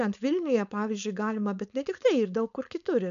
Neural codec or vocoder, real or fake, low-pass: codec, 16 kHz, 4.8 kbps, FACodec; fake; 7.2 kHz